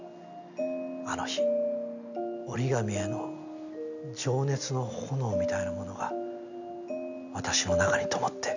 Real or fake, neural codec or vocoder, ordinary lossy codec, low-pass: real; none; none; 7.2 kHz